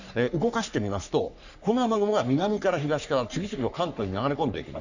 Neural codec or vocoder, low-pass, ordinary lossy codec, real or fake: codec, 44.1 kHz, 3.4 kbps, Pupu-Codec; 7.2 kHz; none; fake